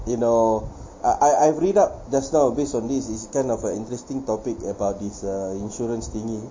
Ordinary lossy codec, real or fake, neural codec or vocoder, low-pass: MP3, 32 kbps; real; none; 7.2 kHz